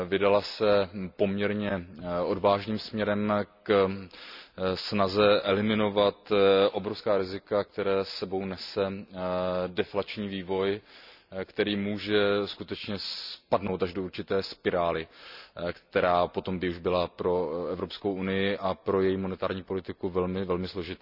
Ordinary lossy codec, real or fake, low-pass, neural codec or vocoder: none; real; 5.4 kHz; none